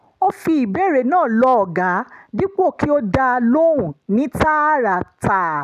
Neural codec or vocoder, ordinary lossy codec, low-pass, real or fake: none; none; 14.4 kHz; real